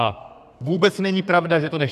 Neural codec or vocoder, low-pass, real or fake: codec, 32 kHz, 1.9 kbps, SNAC; 14.4 kHz; fake